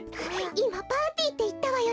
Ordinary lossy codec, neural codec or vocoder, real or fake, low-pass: none; none; real; none